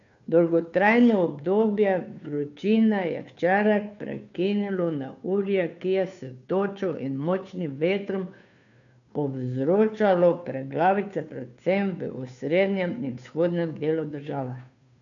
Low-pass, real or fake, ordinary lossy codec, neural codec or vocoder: 7.2 kHz; fake; none; codec, 16 kHz, 2 kbps, FunCodec, trained on Chinese and English, 25 frames a second